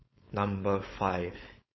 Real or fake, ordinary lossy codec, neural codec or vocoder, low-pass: fake; MP3, 24 kbps; codec, 16 kHz, 4.8 kbps, FACodec; 7.2 kHz